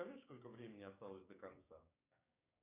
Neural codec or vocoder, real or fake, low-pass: codec, 16 kHz, 6 kbps, DAC; fake; 3.6 kHz